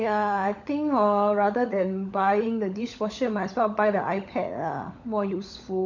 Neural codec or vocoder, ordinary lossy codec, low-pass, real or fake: codec, 16 kHz, 16 kbps, FunCodec, trained on LibriTTS, 50 frames a second; none; 7.2 kHz; fake